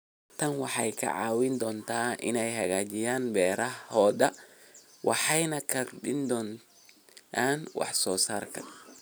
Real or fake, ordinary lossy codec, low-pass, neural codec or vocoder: real; none; none; none